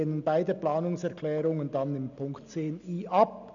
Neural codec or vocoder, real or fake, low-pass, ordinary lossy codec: none; real; 7.2 kHz; none